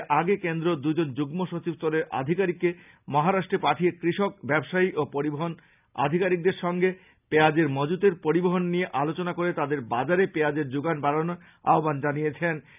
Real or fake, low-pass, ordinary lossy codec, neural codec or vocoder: real; 3.6 kHz; none; none